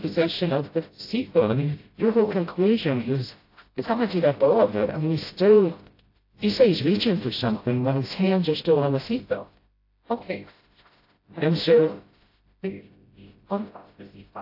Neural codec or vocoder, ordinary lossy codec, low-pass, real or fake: codec, 16 kHz, 0.5 kbps, FreqCodec, smaller model; AAC, 32 kbps; 5.4 kHz; fake